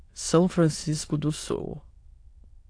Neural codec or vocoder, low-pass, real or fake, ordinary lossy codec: autoencoder, 22.05 kHz, a latent of 192 numbers a frame, VITS, trained on many speakers; 9.9 kHz; fake; AAC, 48 kbps